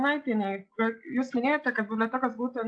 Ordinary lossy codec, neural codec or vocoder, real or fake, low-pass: AAC, 64 kbps; none; real; 9.9 kHz